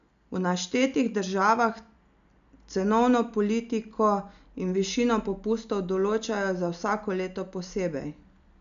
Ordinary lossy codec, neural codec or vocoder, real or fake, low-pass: none; none; real; 7.2 kHz